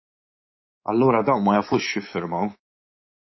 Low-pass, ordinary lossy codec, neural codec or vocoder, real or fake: 7.2 kHz; MP3, 24 kbps; codec, 24 kHz, 3.1 kbps, DualCodec; fake